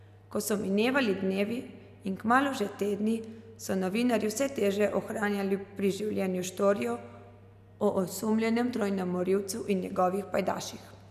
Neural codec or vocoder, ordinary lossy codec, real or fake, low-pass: none; none; real; 14.4 kHz